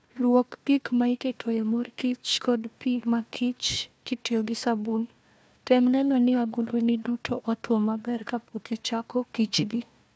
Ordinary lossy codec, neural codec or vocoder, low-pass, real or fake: none; codec, 16 kHz, 1 kbps, FunCodec, trained on Chinese and English, 50 frames a second; none; fake